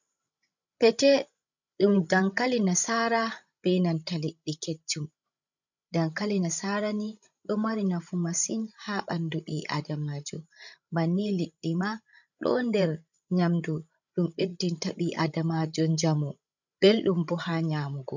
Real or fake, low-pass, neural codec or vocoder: fake; 7.2 kHz; codec, 16 kHz, 16 kbps, FreqCodec, larger model